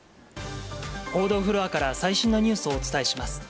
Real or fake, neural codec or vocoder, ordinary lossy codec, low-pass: real; none; none; none